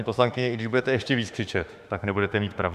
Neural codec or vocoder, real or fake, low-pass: autoencoder, 48 kHz, 32 numbers a frame, DAC-VAE, trained on Japanese speech; fake; 14.4 kHz